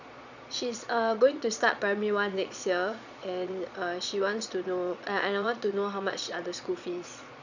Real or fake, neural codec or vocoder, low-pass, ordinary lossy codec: fake; vocoder, 22.05 kHz, 80 mel bands, Vocos; 7.2 kHz; none